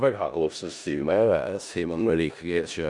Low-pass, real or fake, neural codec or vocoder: 10.8 kHz; fake; codec, 16 kHz in and 24 kHz out, 0.4 kbps, LongCat-Audio-Codec, four codebook decoder